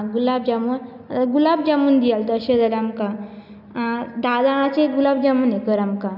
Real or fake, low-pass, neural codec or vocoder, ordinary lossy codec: real; 5.4 kHz; none; none